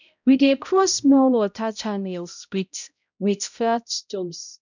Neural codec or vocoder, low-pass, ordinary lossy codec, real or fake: codec, 16 kHz, 0.5 kbps, X-Codec, HuBERT features, trained on balanced general audio; 7.2 kHz; none; fake